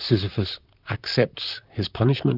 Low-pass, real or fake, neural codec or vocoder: 5.4 kHz; fake; vocoder, 44.1 kHz, 128 mel bands, Pupu-Vocoder